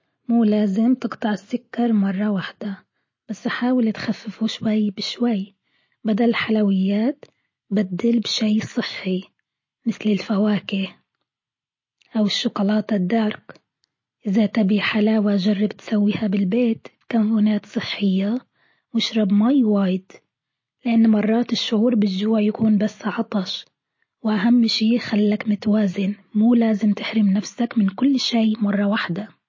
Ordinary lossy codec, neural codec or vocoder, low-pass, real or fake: MP3, 32 kbps; none; 7.2 kHz; real